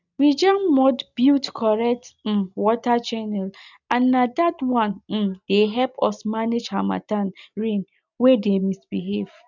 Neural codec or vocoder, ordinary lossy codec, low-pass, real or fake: none; none; 7.2 kHz; real